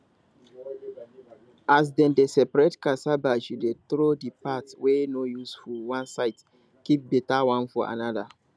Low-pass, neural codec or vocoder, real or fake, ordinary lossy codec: none; none; real; none